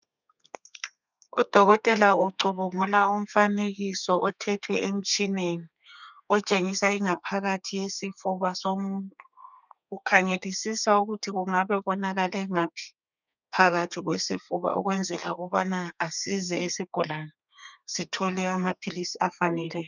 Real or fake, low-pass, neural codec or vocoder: fake; 7.2 kHz; codec, 32 kHz, 1.9 kbps, SNAC